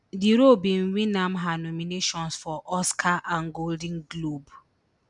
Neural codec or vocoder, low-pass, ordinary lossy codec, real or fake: none; 10.8 kHz; none; real